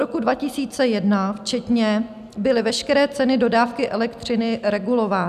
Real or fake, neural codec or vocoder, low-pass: real; none; 14.4 kHz